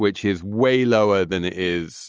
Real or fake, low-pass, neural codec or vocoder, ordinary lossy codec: real; 7.2 kHz; none; Opus, 24 kbps